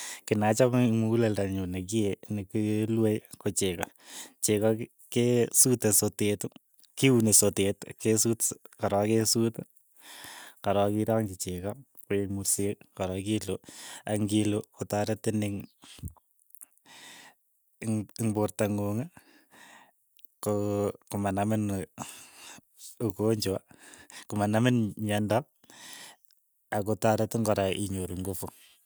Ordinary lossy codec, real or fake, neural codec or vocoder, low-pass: none; real; none; none